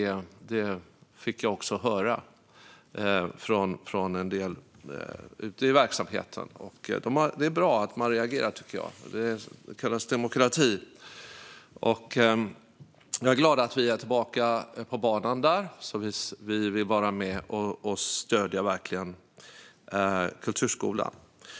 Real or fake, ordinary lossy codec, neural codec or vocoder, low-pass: real; none; none; none